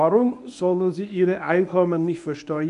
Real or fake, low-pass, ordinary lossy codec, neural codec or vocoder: fake; 10.8 kHz; AAC, 96 kbps; codec, 24 kHz, 0.9 kbps, WavTokenizer, medium speech release version 1